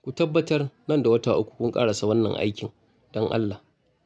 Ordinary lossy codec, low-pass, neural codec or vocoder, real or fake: none; none; none; real